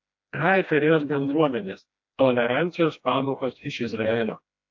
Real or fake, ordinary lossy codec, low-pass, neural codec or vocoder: fake; AAC, 48 kbps; 7.2 kHz; codec, 16 kHz, 1 kbps, FreqCodec, smaller model